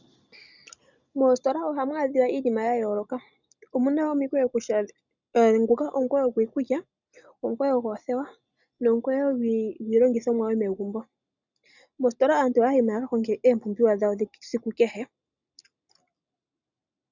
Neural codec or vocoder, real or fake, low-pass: none; real; 7.2 kHz